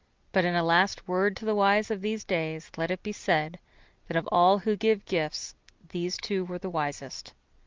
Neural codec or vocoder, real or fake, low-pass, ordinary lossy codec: none; real; 7.2 kHz; Opus, 24 kbps